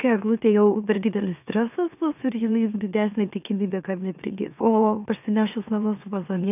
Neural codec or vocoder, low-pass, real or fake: autoencoder, 44.1 kHz, a latent of 192 numbers a frame, MeloTTS; 3.6 kHz; fake